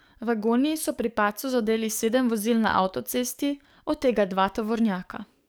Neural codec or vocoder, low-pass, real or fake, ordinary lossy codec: codec, 44.1 kHz, 7.8 kbps, DAC; none; fake; none